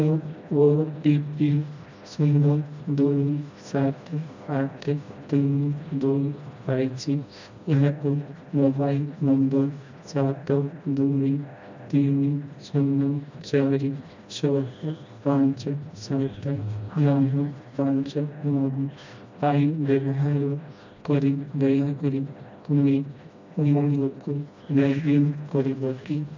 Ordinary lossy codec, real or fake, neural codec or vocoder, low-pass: MP3, 64 kbps; fake; codec, 16 kHz, 1 kbps, FreqCodec, smaller model; 7.2 kHz